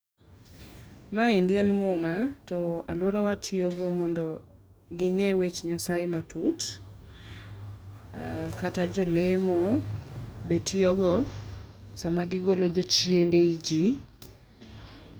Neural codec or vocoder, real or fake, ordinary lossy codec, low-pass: codec, 44.1 kHz, 2.6 kbps, DAC; fake; none; none